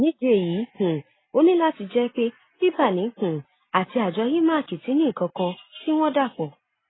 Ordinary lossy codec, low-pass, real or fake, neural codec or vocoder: AAC, 16 kbps; 7.2 kHz; real; none